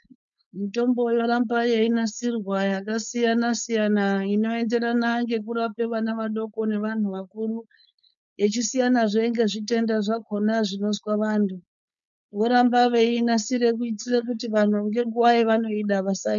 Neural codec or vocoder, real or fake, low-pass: codec, 16 kHz, 4.8 kbps, FACodec; fake; 7.2 kHz